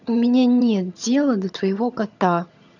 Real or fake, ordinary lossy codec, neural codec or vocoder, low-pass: fake; none; vocoder, 22.05 kHz, 80 mel bands, HiFi-GAN; 7.2 kHz